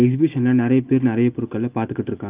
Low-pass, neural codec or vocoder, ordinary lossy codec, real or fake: 3.6 kHz; none; Opus, 32 kbps; real